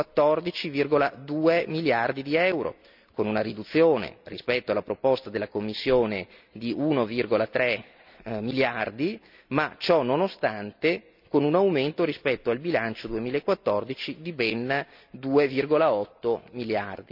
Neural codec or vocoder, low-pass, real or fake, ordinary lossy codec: none; 5.4 kHz; real; none